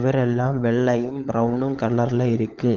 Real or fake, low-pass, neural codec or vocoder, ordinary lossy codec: fake; 7.2 kHz; codec, 16 kHz, 16 kbps, FunCodec, trained on LibriTTS, 50 frames a second; Opus, 24 kbps